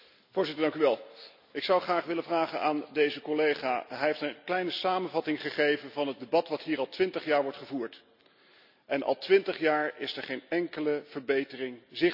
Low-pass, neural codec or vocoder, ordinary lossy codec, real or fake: 5.4 kHz; none; none; real